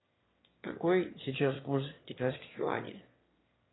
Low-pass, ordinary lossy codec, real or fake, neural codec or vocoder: 7.2 kHz; AAC, 16 kbps; fake; autoencoder, 22.05 kHz, a latent of 192 numbers a frame, VITS, trained on one speaker